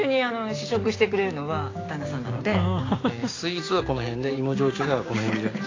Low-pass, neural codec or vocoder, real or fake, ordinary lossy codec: 7.2 kHz; codec, 16 kHz, 6 kbps, DAC; fake; AAC, 32 kbps